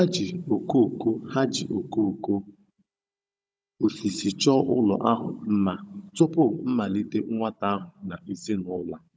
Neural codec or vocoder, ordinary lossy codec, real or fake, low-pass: codec, 16 kHz, 16 kbps, FunCodec, trained on Chinese and English, 50 frames a second; none; fake; none